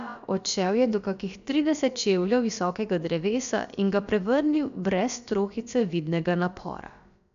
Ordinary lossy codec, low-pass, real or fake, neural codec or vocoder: none; 7.2 kHz; fake; codec, 16 kHz, about 1 kbps, DyCAST, with the encoder's durations